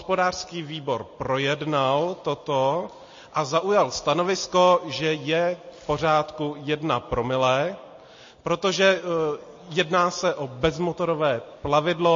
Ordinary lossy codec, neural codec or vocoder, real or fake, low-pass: MP3, 32 kbps; none; real; 7.2 kHz